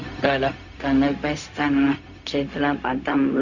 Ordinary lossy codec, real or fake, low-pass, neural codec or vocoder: none; fake; 7.2 kHz; codec, 16 kHz, 0.4 kbps, LongCat-Audio-Codec